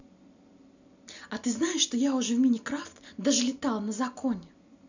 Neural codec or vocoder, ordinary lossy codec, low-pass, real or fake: none; none; 7.2 kHz; real